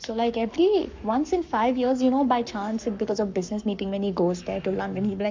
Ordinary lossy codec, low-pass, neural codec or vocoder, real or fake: MP3, 64 kbps; 7.2 kHz; codec, 44.1 kHz, 7.8 kbps, Pupu-Codec; fake